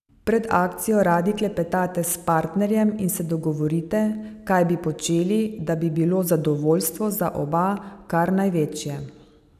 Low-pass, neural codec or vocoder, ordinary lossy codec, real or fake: 14.4 kHz; none; none; real